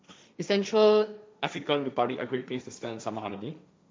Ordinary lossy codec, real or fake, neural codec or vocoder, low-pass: none; fake; codec, 16 kHz, 1.1 kbps, Voila-Tokenizer; none